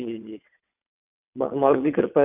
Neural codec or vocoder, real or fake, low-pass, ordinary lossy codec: vocoder, 22.05 kHz, 80 mel bands, WaveNeXt; fake; 3.6 kHz; none